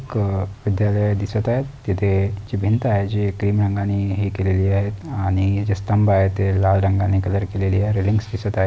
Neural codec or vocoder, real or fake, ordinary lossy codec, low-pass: none; real; none; none